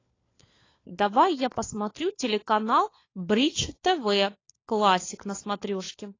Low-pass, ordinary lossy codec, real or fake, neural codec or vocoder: 7.2 kHz; AAC, 32 kbps; fake; codec, 16 kHz, 4 kbps, FunCodec, trained on LibriTTS, 50 frames a second